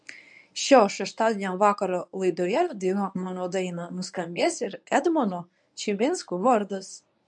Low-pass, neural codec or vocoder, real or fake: 10.8 kHz; codec, 24 kHz, 0.9 kbps, WavTokenizer, medium speech release version 1; fake